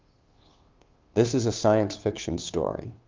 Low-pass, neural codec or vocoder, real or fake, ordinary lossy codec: 7.2 kHz; codec, 16 kHz, 2 kbps, FunCodec, trained on Chinese and English, 25 frames a second; fake; Opus, 24 kbps